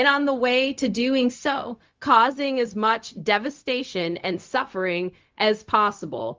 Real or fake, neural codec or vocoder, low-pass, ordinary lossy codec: fake; codec, 16 kHz, 0.4 kbps, LongCat-Audio-Codec; 7.2 kHz; Opus, 32 kbps